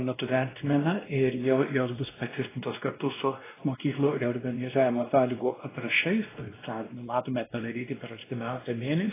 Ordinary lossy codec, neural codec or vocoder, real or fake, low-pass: AAC, 16 kbps; codec, 16 kHz, 1 kbps, X-Codec, WavLM features, trained on Multilingual LibriSpeech; fake; 3.6 kHz